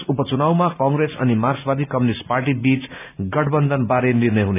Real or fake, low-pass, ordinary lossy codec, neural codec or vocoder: real; 3.6 kHz; none; none